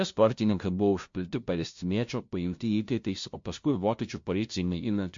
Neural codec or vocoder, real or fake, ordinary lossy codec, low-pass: codec, 16 kHz, 0.5 kbps, FunCodec, trained on LibriTTS, 25 frames a second; fake; MP3, 48 kbps; 7.2 kHz